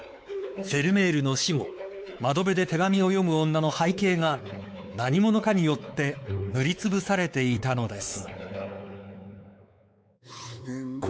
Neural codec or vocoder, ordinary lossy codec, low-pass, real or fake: codec, 16 kHz, 4 kbps, X-Codec, WavLM features, trained on Multilingual LibriSpeech; none; none; fake